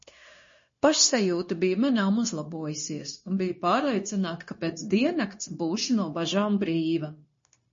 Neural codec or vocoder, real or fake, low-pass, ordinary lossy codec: codec, 16 kHz, 0.9 kbps, LongCat-Audio-Codec; fake; 7.2 kHz; MP3, 32 kbps